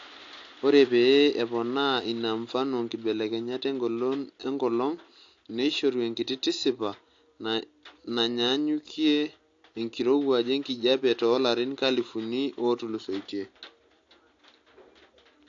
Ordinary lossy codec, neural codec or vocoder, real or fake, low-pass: AAC, 48 kbps; none; real; 7.2 kHz